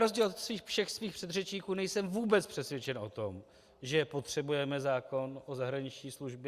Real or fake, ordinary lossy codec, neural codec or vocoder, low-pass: real; Opus, 64 kbps; none; 14.4 kHz